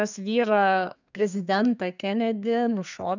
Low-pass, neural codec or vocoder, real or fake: 7.2 kHz; codec, 32 kHz, 1.9 kbps, SNAC; fake